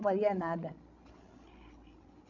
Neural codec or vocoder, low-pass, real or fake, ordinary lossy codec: codec, 16 kHz, 16 kbps, FreqCodec, larger model; 7.2 kHz; fake; none